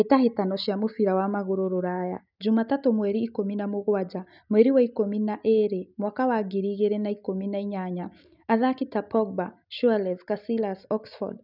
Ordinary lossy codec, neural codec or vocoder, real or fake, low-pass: none; none; real; 5.4 kHz